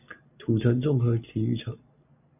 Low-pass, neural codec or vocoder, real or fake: 3.6 kHz; none; real